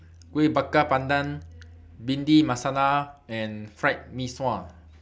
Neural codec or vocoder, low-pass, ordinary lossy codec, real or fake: none; none; none; real